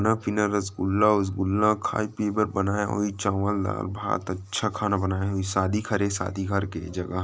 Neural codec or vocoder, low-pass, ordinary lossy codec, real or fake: none; none; none; real